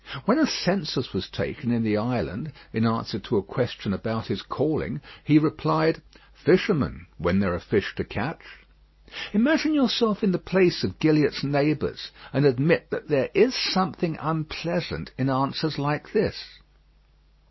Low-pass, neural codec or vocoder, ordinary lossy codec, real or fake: 7.2 kHz; none; MP3, 24 kbps; real